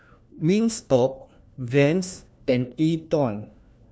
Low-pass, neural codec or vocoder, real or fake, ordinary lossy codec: none; codec, 16 kHz, 1 kbps, FunCodec, trained on LibriTTS, 50 frames a second; fake; none